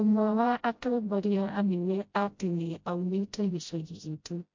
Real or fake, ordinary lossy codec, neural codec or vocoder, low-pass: fake; MP3, 48 kbps; codec, 16 kHz, 0.5 kbps, FreqCodec, smaller model; 7.2 kHz